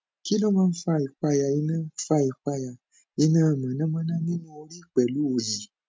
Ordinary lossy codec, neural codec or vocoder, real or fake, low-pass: none; none; real; none